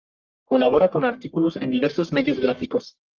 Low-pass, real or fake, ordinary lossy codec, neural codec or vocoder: 7.2 kHz; fake; Opus, 24 kbps; codec, 44.1 kHz, 1.7 kbps, Pupu-Codec